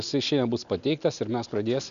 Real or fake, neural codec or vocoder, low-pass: real; none; 7.2 kHz